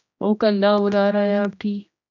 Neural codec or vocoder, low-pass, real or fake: codec, 16 kHz, 1 kbps, X-Codec, HuBERT features, trained on general audio; 7.2 kHz; fake